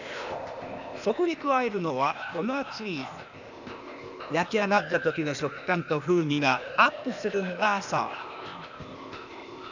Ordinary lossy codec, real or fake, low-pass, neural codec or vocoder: none; fake; 7.2 kHz; codec, 16 kHz, 0.8 kbps, ZipCodec